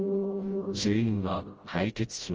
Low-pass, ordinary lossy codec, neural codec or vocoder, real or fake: 7.2 kHz; Opus, 24 kbps; codec, 16 kHz, 0.5 kbps, FreqCodec, smaller model; fake